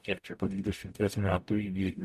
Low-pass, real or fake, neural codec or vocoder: 14.4 kHz; fake; codec, 44.1 kHz, 0.9 kbps, DAC